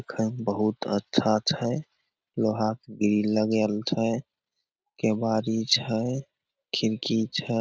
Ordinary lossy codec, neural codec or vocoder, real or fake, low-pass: none; none; real; none